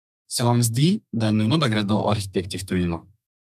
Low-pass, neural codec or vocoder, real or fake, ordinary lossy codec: 14.4 kHz; codec, 32 kHz, 1.9 kbps, SNAC; fake; MP3, 96 kbps